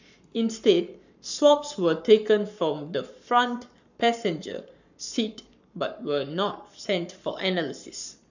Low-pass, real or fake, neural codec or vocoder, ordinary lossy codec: 7.2 kHz; fake; codec, 44.1 kHz, 7.8 kbps, Pupu-Codec; none